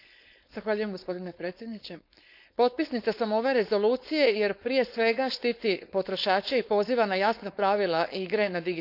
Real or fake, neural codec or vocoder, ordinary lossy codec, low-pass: fake; codec, 16 kHz, 4.8 kbps, FACodec; none; 5.4 kHz